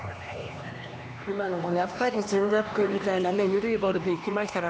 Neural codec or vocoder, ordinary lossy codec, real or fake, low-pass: codec, 16 kHz, 2 kbps, X-Codec, HuBERT features, trained on LibriSpeech; none; fake; none